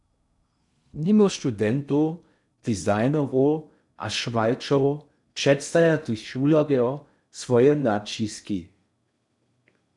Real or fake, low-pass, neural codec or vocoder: fake; 10.8 kHz; codec, 16 kHz in and 24 kHz out, 0.6 kbps, FocalCodec, streaming, 2048 codes